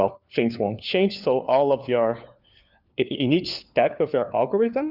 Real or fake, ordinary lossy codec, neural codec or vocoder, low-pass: fake; Opus, 64 kbps; codec, 16 kHz, 4 kbps, FunCodec, trained on LibriTTS, 50 frames a second; 5.4 kHz